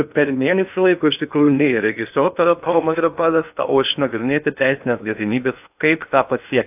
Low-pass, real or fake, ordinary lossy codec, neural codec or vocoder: 3.6 kHz; fake; AAC, 32 kbps; codec, 16 kHz in and 24 kHz out, 0.6 kbps, FocalCodec, streaming, 2048 codes